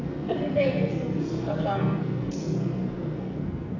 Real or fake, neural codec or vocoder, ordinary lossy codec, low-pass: fake; codec, 44.1 kHz, 2.6 kbps, SNAC; AAC, 32 kbps; 7.2 kHz